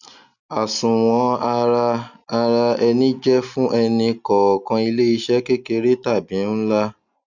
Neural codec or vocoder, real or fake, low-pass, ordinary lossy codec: none; real; 7.2 kHz; none